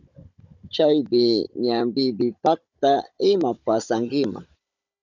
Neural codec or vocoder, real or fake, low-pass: codec, 16 kHz, 16 kbps, FunCodec, trained on Chinese and English, 50 frames a second; fake; 7.2 kHz